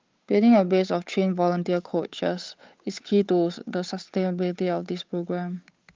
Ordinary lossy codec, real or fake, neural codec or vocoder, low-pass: Opus, 24 kbps; fake; codec, 16 kHz, 8 kbps, FunCodec, trained on Chinese and English, 25 frames a second; 7.2 kHz